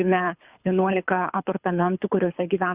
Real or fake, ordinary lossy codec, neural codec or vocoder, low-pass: fake; Opus, 64 kbps; codec, 24 kHz, 6 kbps, HILCodec; 3.6 kHz